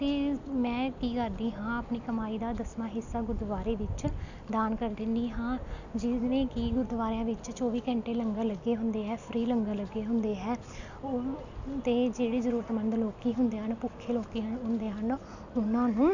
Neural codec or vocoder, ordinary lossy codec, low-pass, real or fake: none; none; 7.2 kHz; real